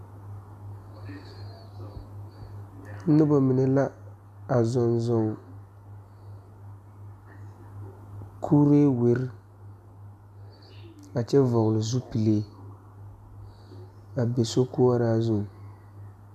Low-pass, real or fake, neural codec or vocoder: 14.4 kHz; real; none